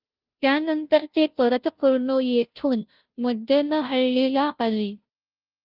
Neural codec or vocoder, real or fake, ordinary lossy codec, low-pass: codec, 16 kHz, 0.5 kbps, FunCodec, trained on Chinese and English, 25 frames a second; fake; Opus, 32 kbps; 5.4 kHz